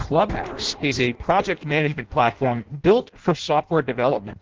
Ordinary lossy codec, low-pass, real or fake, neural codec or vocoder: Opus, 16 kbps; 7.2 kHz; fake; codec, 16 kHz in and 24 kHz out, 0.6 kbps, FireRedTTS-2 codec